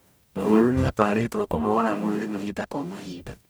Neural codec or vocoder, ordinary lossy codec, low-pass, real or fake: codec, 44.1 kHz, 0.9 kbps, DAC; none; none; fake